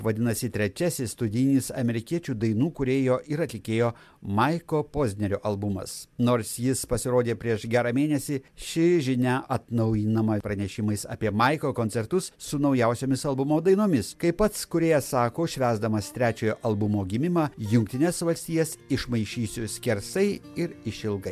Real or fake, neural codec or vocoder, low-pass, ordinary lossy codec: real; none; 14.4 kHz; MP3, 96 kbps